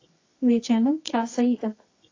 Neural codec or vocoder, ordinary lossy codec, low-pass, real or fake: codec, 24 kHz, 0.9 kbps, WavTokenizer, medium music audio release; AAC, 32 kbps; 7.2 kHz; fake